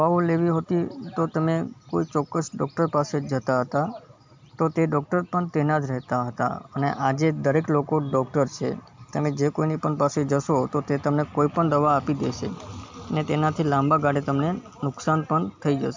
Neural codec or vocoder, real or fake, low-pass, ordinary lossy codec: none; real; 7.2 kHz; MP3, 64 kbps